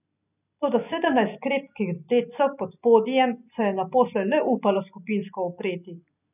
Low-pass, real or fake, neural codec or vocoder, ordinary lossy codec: 3.6 kHz; real; none; none